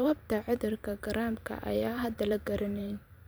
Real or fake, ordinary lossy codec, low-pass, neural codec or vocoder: fake; none; none; vocoder, 44.1 kHz, 128 mel bands every 512 samples, BigVGAN v2